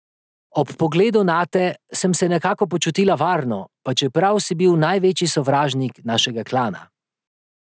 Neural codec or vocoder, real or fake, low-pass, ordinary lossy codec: none; real; none; none